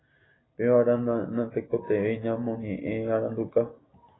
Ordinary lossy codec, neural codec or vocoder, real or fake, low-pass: AAC, 16 kbps; none; real; 7.2 kHz